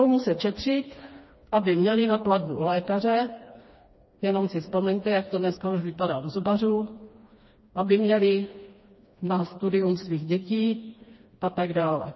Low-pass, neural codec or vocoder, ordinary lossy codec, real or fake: 7.2 kHz; codec, 16 kHz, 2 kbps, FreqCodec, smaller model; MP3, 24 kbps; fake